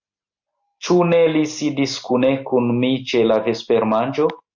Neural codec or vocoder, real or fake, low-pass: none; real; 7.2 kHz